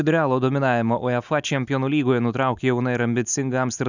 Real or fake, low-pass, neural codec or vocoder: real; 7.2 kHz; none